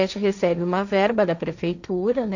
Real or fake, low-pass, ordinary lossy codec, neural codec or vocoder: fake; 7.2 kHz; none; codec, 16 kHz, 1.1 kbps, Voila-Tokenizer